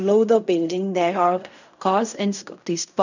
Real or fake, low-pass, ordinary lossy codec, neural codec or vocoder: fake; 7.2 kHz; none; codec, 16 kHz in and 24 kHz out, 0.4 kbps, LongCat-Audio-Codec, fine tuned four codebook decoder